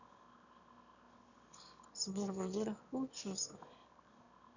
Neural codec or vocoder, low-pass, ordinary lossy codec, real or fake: autoencoder, 22.05 kHz, a latent of 192 numbers a frame, VITS, trained on one speaker; 7.2 kHz; none; fake